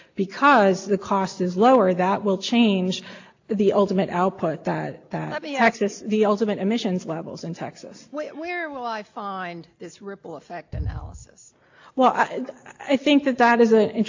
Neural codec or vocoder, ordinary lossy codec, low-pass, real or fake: none; AAC, 48 kbps; 7.2 kHz; real